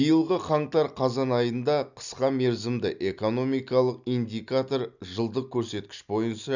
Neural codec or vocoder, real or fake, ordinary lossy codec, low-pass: none; real; none; 7.2 kHz